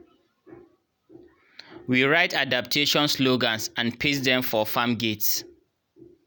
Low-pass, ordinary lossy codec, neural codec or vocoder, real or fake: none; none; none; real